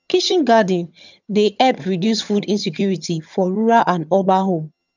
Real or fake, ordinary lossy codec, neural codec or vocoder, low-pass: fake; none; vocoder, 22.05 kHz, 80 mel bands, HiFi-GAN; 7.2 kHz